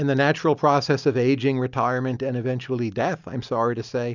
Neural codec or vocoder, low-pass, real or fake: none; 7.2 kHz; real